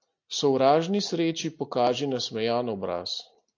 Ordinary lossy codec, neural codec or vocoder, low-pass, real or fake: AAC, 48 kbps; none; 7.2 kHz; real